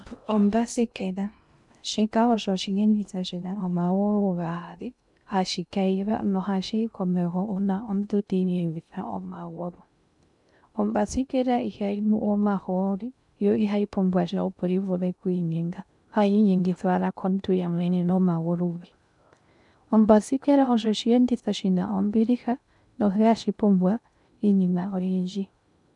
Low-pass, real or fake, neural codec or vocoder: 10.8 kHz; fake; codec, 16 kHz in and 24 kHz out, 0.6 kbps, FocalCodec, streaming, 2048 codes